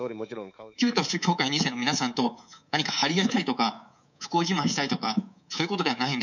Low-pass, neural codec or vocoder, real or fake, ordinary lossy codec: 7.2 kHz; codec, 24 kHz, 3.1 kbps, DualCodec; fake; none